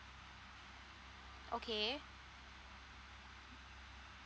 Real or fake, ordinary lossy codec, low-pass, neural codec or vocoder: real; none; none; none